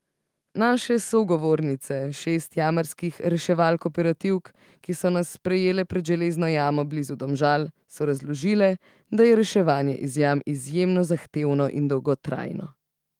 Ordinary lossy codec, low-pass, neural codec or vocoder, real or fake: Opus, 24 kbps; 19.8 kHz; autoencoder, 48 kHz, 128 numbers a frame, DAC-VAE, trained on Japanese speech; fake